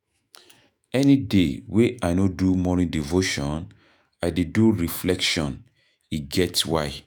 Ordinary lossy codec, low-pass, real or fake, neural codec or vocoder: none; none; fake; autoencoder, 48 kHz, 128 numbers a frame, DAC-VAE, trained on Japanese speech